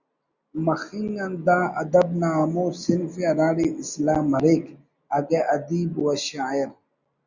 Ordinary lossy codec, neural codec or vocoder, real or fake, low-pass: Opus, 64 kbps; none; real; 7.2 kHz